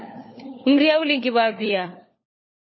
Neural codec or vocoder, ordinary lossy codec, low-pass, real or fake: codec, 16 kHz, 4 kbps, FunCodec, trained on LibriTTS, 50 frames a second; MP3, 24 kbps; 7.2 kHz; fake